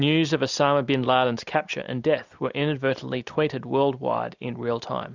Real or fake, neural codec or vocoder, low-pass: real; none; 7.2 kHz